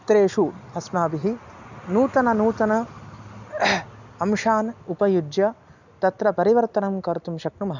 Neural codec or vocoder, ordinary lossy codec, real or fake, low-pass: none; none; real; 7.2 kHz